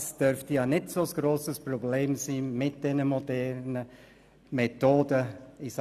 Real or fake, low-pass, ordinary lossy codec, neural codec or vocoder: real; 14.4 kHz; none; none